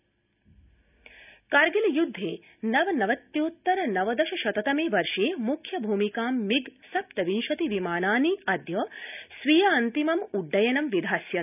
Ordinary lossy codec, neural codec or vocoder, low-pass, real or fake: none; none; 3.6 kHz; real